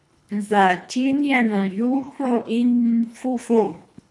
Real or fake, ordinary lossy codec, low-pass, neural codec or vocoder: fake; none; none; codec, 24 kHz, 1.5 kbps, HILCodec